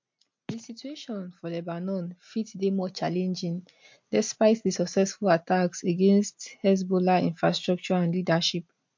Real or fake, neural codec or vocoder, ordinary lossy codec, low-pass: real; none; MP3, 48 kbps; 7.2 kHz